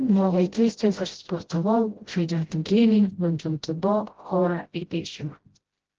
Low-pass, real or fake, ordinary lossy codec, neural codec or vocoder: 7.2 kHz; fake; Opus, 16 kbps; codec, 16 kHz, 0.5 kbps, FreqCodec, smaller model